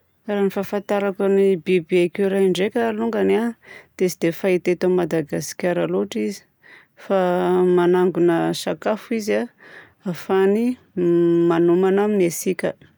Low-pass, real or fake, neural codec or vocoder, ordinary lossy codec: none; real; none; none